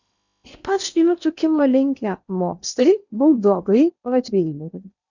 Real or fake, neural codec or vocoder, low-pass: fake; codec, 16 kHz in and 24 kHz out, 0.8 kbps, FocalCodec, streaming, 65536 codes; 7.2 kHz